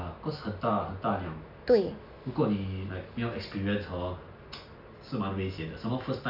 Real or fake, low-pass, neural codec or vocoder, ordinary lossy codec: real; 5.4 kHz; none; AAC, 48 kbps